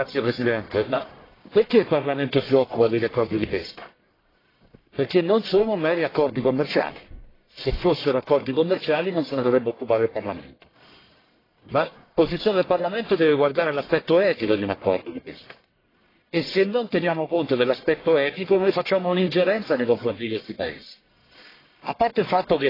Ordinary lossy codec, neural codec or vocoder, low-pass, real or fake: AAC, 24 kbps; codec, 44.1 kHz, 1.7 kbps, Pupu-Codec; 5.4 kHz; fake